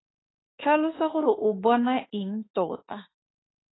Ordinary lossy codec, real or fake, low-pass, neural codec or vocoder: AAC, 16 kbps; fake; 7.2 kHz; autoencoder, 48 kHz, 32 numbers a frame, DAC-VAE, trained on Japanese speech